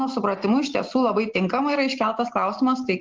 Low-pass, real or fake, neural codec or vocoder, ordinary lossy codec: 7.2 kHz; real; none; Opus, 16 kbps